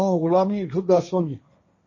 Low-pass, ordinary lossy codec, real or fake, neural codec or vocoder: 7.2 kHz; MP3, 32 kbps; fake; codec, 16 kHz, 1.1 kbps, Voila-Tokenizer